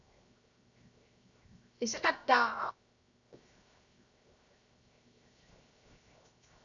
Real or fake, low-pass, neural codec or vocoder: fake; 7.2 kHz; codec, 16 kHz, 0.7 kbps, FocalCodec